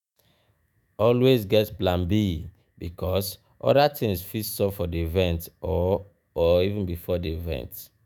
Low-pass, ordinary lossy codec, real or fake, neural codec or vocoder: none; none; fake; autoencoder, 48 kHz, 128 numbers a frame, DAC-VAE, trained on Japanese speech